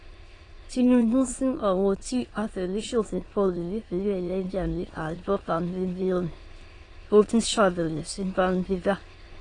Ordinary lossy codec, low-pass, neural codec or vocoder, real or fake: AAC, 32 kbps; 9.9 kHz; autoencoder, 22.05 kHz, a latent of 192 numbers a frame, VITS, trained on many speakers; fake